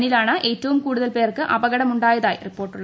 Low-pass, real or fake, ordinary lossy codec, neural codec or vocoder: none; real; none; none